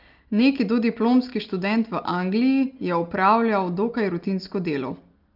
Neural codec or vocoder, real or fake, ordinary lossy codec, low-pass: none; real; Opus, 32 kbps; 5.4 kHz